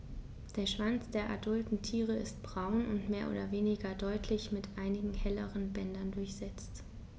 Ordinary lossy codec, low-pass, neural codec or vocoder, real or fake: none; none; none; real